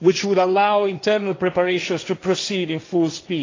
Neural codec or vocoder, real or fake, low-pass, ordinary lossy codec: codec, 16 kHz, 1.1 kbps, Voila-Tokenizer; fake; 7.2 kHz; AAC, 32 kbps